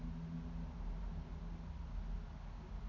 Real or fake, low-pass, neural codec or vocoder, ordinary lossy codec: real; 7.2 kHz; none; none